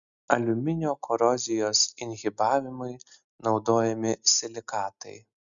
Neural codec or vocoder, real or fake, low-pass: none; real; 7.2 kHz